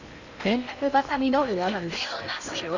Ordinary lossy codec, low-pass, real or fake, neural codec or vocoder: none; 7.2 kHz; fake; codec, 16 kHz in and 24 kHz out, 0.8 kbps, FocalCodec, streaming, 65536 codes